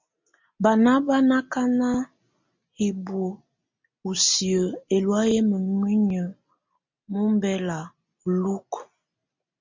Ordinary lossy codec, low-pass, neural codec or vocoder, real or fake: MP3, 64 kbps; 7.2 kHz; none; real